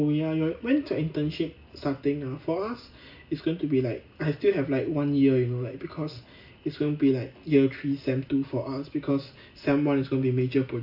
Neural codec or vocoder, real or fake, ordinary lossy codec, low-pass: none; real; AAC, 48 kbps; 5.4 kHz